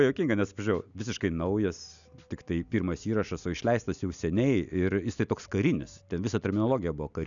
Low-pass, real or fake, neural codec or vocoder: 7.2 kHz; real; none